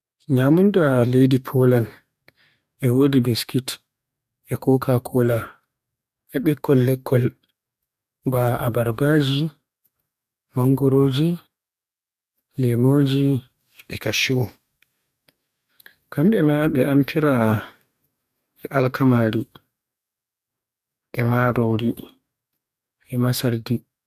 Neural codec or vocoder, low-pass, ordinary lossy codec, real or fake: codec, 44.1 kHz, 2.6 kbps, DAC; 14.4 kHz; none; fake